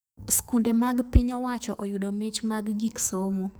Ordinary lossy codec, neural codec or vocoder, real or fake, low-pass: none; codec, 44.1 kHz, 2.6 kbps, SNAC; fake; none